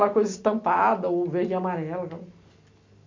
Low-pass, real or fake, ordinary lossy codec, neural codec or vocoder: 7.2 kHz; real; MP3, 48 kbps; none